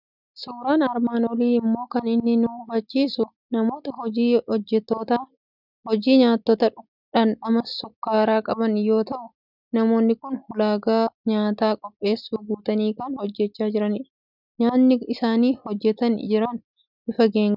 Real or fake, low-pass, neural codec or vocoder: real; 5.4 kHz; none